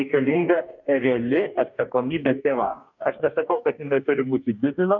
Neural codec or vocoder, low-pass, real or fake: codec, 44.1 kHz, 2.6 kbps, DAC; 7.2 kHz; fake